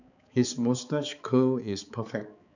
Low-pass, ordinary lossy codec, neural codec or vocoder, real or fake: 7.2 kHz; none; codec, 16 kHz, 4 kbps, X-Codec, HuBERT features, trained on balanced general audio; fake